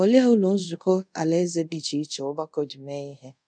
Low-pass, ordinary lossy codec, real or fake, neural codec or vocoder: 9.9 kHz; none; fake; codec, 24 kHz, 0.5 kbps, DualCodec